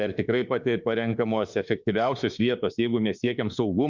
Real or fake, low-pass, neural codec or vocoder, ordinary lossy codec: fake; 7.2 kHz; autoencoder, 48 kHz, 32 numbers a frame, DAC-VAE, trained on Japanese speech; Opus, 64 kbps